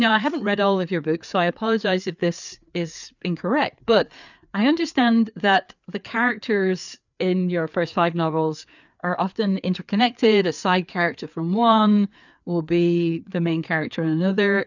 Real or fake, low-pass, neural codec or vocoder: fake; 7.2 kHz; codec, 16 kHz, 4 kbps, FreqCodec, larger model